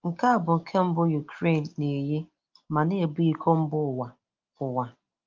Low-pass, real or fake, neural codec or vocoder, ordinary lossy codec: 7.2 kHz; real; none; Opus, 32 kbps